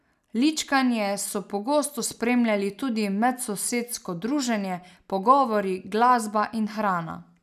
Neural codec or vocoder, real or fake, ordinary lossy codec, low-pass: none; real; none; 14.4 kHz